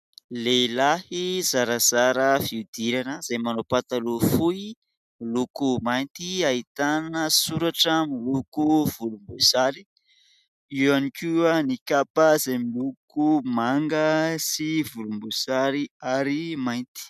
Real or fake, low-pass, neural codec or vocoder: real; 14.4 kHz; none